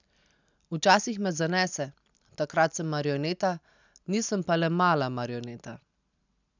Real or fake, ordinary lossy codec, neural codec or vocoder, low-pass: real; none; none; 7.2 kHz